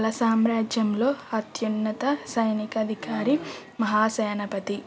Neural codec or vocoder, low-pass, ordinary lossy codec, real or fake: none; none; none; real